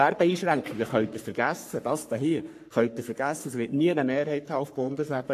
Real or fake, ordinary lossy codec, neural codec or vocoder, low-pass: fake; AAC, 64 kbps; codec, 44.1 kHz, 3.4 kbps, Pupu-Codec; 14.4 kHz